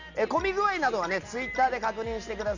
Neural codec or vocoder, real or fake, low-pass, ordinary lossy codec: none; real; 7.2 kHz; none